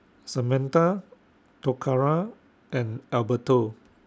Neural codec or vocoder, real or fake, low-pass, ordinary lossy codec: none; real; none; none